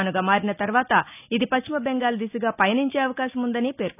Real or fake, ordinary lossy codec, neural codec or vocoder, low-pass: real; none; none; 3.6 kHz